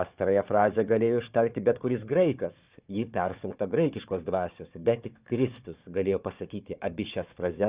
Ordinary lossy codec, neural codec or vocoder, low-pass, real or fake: Opus, 64 kbps; codec, 16 kHz, 4 kbps, FunCodec, trained on Chinese and English, 50 frames a second; 3.6 kHz; fake